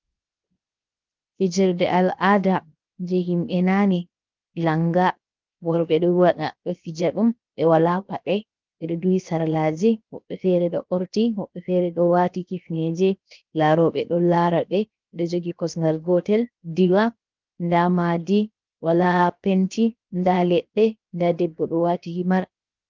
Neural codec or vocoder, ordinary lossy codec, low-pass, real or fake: codec, 16 kHz, 0.7 kbps, FocalCodec; Opus, 24 kbps; 7.2 kHz; fake